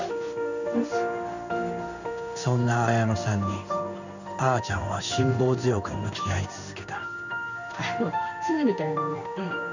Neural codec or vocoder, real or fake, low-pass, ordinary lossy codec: codec, 16 kHz in and 24 kHz out, 1 kbps, XY-Tokenizer; fake; 7.2 kHz; none